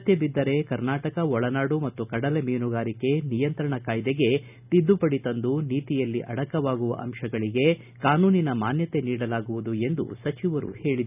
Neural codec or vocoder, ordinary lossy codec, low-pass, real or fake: none; none; 3.6 kHz; real